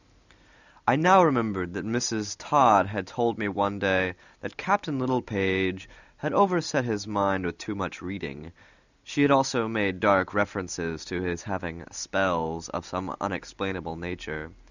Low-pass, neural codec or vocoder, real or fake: 7.2 kHz; none; real